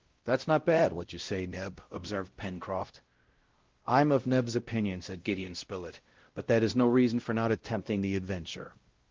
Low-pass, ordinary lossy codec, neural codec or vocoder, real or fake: 7.2 kHz; Opus, 16 kbps; codec, 16 kHz, 0.5 kbps, X-Codec, WavLM features, trained on Multilingual LibriSpeech; fake